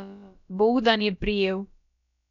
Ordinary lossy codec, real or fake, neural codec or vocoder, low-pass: none; fake; codec, 16 kHz, about 1 kbps, DyCAST, with the encoder's durations; 7.2 kHz